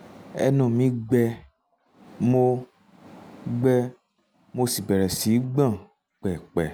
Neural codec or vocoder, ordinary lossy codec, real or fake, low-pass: none; none; real; none